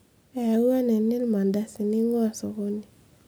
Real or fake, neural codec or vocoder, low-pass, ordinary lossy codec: real; none; none; none